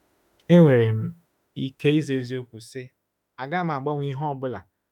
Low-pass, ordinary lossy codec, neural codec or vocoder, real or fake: 19.8 kHz; none; autoencoder, 48 kHz, 32 numbers a frame, DAC-VAE, trained on Japanese speech; fake